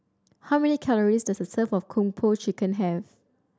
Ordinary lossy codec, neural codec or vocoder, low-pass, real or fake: none; none; none; real